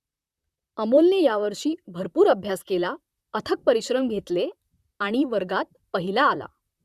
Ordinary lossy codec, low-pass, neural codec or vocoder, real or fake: Opus, 64 kbps; 14.4 kHz; vocoder, 44.1 kHz, 128 mel bands, Pupu-Vocoder; fake